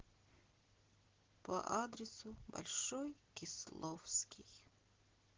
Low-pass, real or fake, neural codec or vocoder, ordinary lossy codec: 7.2 kHz; real; none; Opus, 16 kbps